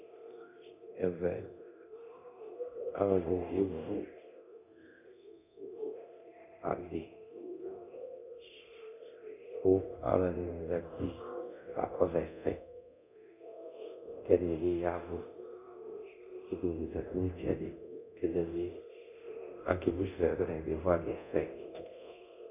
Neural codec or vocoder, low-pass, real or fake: codec, 24 kHz, 0.9 kbps, DualCodec; 3.6 kHz; fake